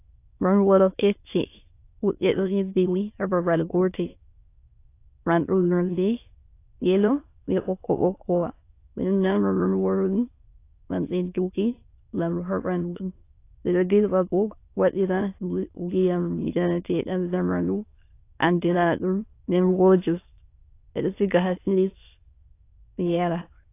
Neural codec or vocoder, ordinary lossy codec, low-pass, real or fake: autoencoder, 22.05 kHz, a latent of 192 numbers a frame, VITS, trained on many speakers; AAC, 24 kbps; 3.6 kHz; fake